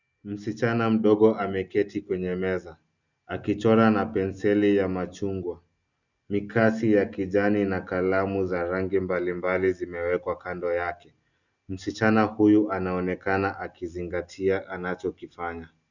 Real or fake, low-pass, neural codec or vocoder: real; 7.2 kHz; none